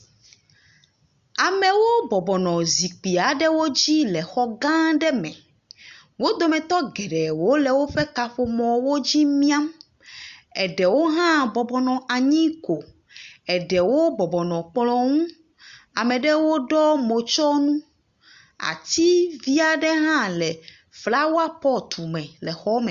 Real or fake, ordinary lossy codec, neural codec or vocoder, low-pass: real; Opus, 64 kbps; none; 7.2 kHz